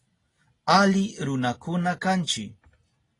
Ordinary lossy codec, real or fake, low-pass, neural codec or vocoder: AAC, 32 kbps; real; 10.8 kHz; none